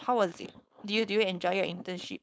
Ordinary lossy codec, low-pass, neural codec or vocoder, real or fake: none; none; codec, 16 kHz, 4.8 kbps, FACodec; fake